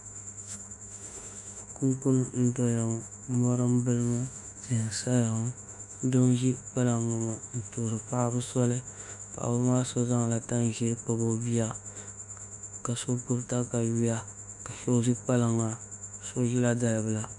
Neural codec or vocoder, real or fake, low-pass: autoencoder, 48 kHz, 32 numbers a frame, DAC-VAE, trained on Japanese speech; fake; 10.8 kHz